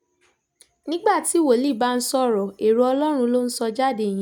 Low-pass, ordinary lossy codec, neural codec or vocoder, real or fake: 19.8 kHz; none; none; real